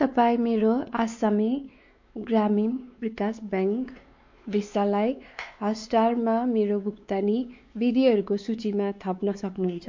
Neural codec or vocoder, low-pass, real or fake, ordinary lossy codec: codec, 16 kHz, 4 kbps, X-Codec, WavLM features, trained on Multilingual LibriSpeech; 7.2 kHz; fake; MP3, 48 kbps